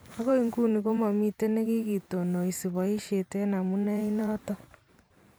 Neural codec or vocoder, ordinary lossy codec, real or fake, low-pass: vocoder, 44.1 kHz, 128 mel bands every 512 samples, BigVGAN v2; none; fake; none